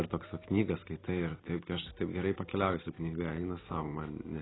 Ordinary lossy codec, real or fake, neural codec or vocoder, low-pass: AAC, 16 kbps; real; none; 7.2 kHz